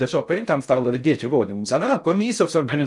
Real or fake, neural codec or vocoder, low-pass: fake; codec, 16 kHz in and 24 kHz out, 0.6 kbps, FocalCodec, streaming, 2048 codes; 10.8 kHz